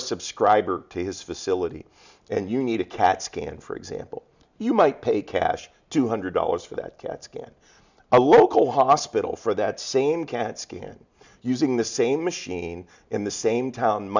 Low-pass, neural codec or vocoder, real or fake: 7.2 kHz; none; real